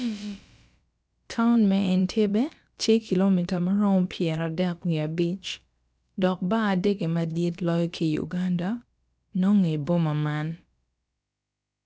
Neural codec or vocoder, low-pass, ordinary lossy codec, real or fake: codec, 16 kHz, about 1 kbps, DyCAST, with the encoder's durations; none; none; fake